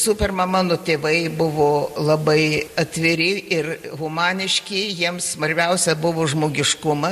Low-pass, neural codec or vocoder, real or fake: 14.4 kHz; none; real